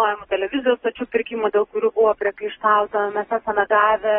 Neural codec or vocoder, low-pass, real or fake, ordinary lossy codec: none; 7.2 kHz; real; AAC, 16 kbps